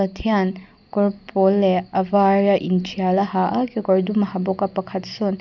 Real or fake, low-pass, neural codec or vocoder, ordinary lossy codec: real; 7.2 kHz; none; none